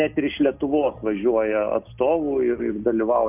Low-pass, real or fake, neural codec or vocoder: 3.6 kHz; real; none